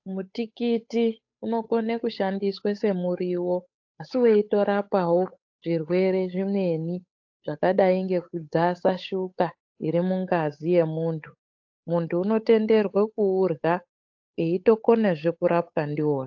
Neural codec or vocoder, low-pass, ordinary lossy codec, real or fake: codec, 16 kHz, 8 kbps, FunCodec, trained on Chinese and English, 25 frames a second; 7.2 kHz; AAC, 48 kbps; fake